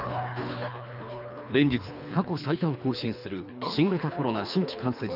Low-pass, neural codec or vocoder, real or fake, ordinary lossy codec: 5.4 kHz; codec, 24 kHz, 3 kbps, HILCodec; fake; none